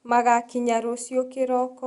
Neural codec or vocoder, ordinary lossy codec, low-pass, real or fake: none; Opus, 64 kbps; 10.8 kHz; real